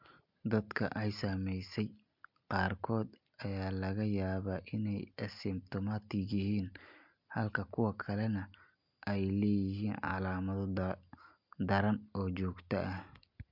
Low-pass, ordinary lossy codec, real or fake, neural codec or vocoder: 5.4 kHz; MP3, 48 kbps; real; none